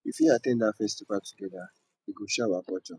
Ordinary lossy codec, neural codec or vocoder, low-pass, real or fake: none; none; none; real